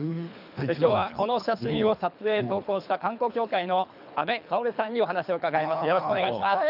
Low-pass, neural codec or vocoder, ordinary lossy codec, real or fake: 5.4 kHz; codec, 24 kHz, 3 kbps, HILCodec; none; fake